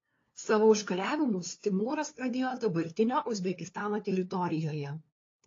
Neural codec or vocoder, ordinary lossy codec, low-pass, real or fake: codec, 16 kHz, 2 kbps, FunCodec, trained on LibriTTS, 25 frames a second; AAC, 32 kbps; 7.2 kHz; fake